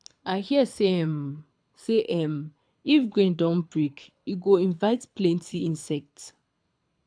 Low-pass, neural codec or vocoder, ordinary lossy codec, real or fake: 9.9 kHz; codec, 24 kHz, 6 kbps, HILCodec; none; fake